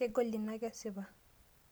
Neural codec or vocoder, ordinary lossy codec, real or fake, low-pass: none; none; real; none